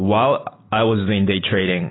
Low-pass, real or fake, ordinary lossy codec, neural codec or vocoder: 7.2 kHz; real; AAC, 16 kbps; none